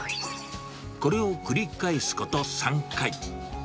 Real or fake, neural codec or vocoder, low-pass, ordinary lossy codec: real; none; none; none